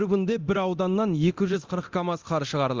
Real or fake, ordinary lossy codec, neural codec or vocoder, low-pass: fake; Opus, 32 kbps; codec, 24 kHz, 0.9 kbps, DualCodec; 7.2 kHz